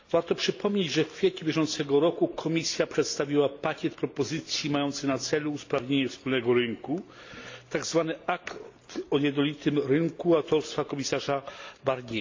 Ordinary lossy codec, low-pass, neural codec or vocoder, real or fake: AAC, 48 kbps; 7.2 kHz; none; real